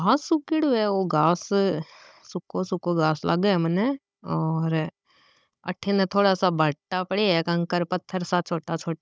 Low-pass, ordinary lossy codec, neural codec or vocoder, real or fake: none; none; codec, 16 kHz, 16 kbps, FunCodec, trained on Chinese and English, 50 frames a second; fake